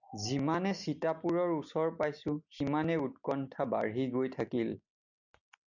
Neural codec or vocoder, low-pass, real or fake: none; 7.2 kHz; real